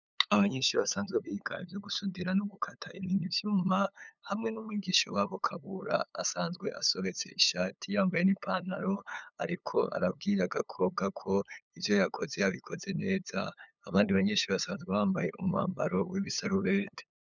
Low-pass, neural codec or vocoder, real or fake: 7.2 kHz; codec, 16 kHz, 8 kbps, FunCodec, trained on LibriTTS, 25 frames a second; fake